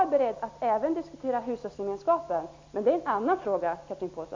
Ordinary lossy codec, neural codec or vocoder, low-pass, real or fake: MP3, 48 kbps; none; 7.2 kHz; real